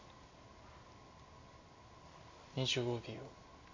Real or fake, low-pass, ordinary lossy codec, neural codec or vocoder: real; 7.2 kHz; MP3, 64 kbps; none